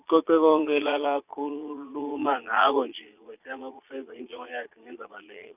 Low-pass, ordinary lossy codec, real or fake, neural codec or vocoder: 3.6 kHz; none; fake; vocoder, 22.05 kHz, 80 mel bands, Vocos